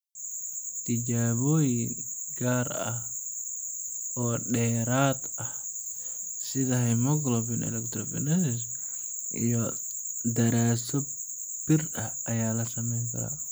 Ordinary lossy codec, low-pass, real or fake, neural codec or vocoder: none; none; real; none